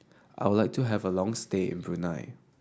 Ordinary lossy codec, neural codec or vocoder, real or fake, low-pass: none; none; real; none